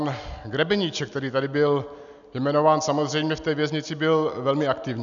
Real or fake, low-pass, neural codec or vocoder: real; 7.2 kHz; none